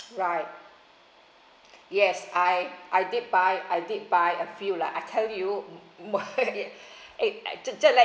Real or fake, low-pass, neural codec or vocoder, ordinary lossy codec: real; none; none; none